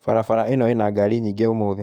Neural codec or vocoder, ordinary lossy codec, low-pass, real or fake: codec, 44.1 kHz, 7.8 kbps, DAC; Opus, 64 kbps; 19.8 kHz; fake